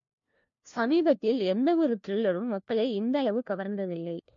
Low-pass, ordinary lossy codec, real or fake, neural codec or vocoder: 7.2 kHz; MP3, 48 kbps; fake; codec, 16 kHz, 1 kbps, FunCodec, trained on LibriTTS, 50 frames a second